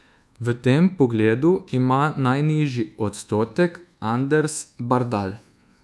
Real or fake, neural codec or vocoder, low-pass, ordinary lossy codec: fake; codec, 24 kHz, 1.2 kbps, DualCodec; none; none